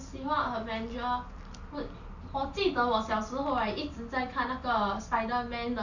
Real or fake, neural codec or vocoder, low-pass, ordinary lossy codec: real; none; 7.2 kHz; none